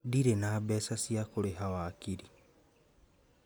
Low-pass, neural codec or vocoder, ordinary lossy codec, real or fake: none; none; none; real